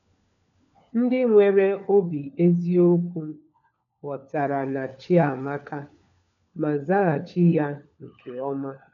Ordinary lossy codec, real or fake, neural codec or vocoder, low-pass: none; fake; codec, 16 kHz, 4 kbps, FunCodec, trained on LibriTTS, 50 frames a second; 7.2 kHz